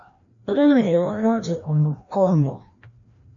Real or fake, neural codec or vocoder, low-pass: fake; codec, 16 kHz, 1 kbps, FreqCodec, larger model; 7.2 kHz